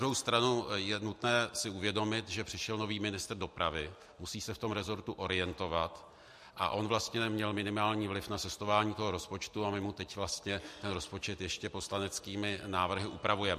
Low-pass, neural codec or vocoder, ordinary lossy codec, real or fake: 14.4 kHz; none; MP3, 64 kbps; real